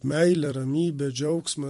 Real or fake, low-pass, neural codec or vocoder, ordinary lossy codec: fake; 14.4 kHz; vocoder, 44.1 kHz, 128 mel bands every 256 samples, BigVGAN v2; MP3, 48 kbps